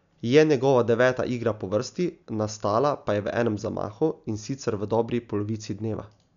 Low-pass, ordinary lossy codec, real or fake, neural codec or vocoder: 7.2 kHz; none; real; none